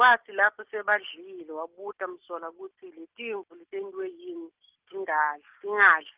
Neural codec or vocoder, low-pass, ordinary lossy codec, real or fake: none; 3.6 kHz; Opus, 32 kbps; real